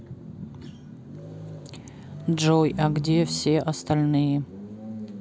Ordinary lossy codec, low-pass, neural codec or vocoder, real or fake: none; none; none; real